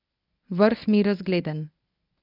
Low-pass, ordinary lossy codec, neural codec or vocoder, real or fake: 5.4 kHz; none; codec, 24 kHz, 0.9 kbps, WavTokenizer, medium speech release version 1; fake